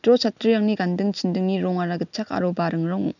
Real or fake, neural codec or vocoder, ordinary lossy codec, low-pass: real; none; none; 7.2 kHz